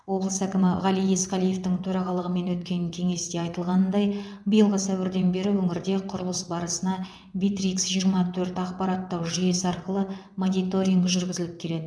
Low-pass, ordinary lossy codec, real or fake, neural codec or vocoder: none; none; fake; vocoder, 22.05 kHz, 80 mel bands, WaveNeXt